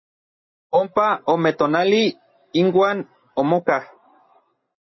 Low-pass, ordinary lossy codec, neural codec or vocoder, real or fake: 7.2 kHz; MP3, 24 kbps; none; real